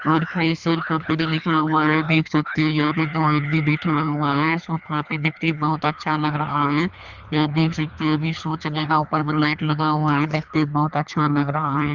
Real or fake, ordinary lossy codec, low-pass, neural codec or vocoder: fake; Opus, 64 kbps; 7.2 kHz; codec, 24 kHz, 3 kbps, HILCodec